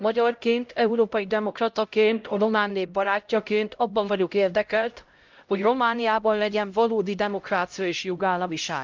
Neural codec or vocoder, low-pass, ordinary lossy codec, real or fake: codec, 16 kHz, 0.5 kbps, X-Codec, HuBERT features, trained on LibriSpeech; 7.2 kHz; Opus, 24 kbps; fake